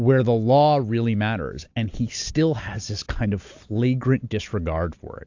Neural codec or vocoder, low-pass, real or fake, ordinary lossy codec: none; 7.2 kHz; real; AAC, 48 kbps